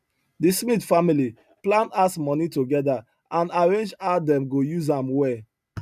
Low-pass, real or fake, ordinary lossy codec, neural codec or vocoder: 14.4 kHz; real; none; none